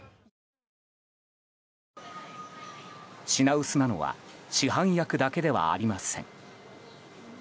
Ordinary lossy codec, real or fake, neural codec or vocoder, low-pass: none; real; none; none